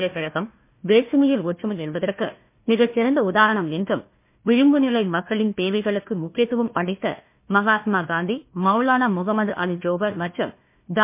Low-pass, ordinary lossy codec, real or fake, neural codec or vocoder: 3.6 kHz; MP3, 24 kbps; fake; codec, 16 kHz, 1 kbps, FunCodec, trained on Chinese and English, 50 frames a second